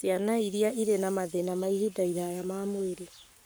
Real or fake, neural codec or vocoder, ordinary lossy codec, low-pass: fake; codec, 44.1 kHz, 7.8 kbps, Pupu-Codec; none; none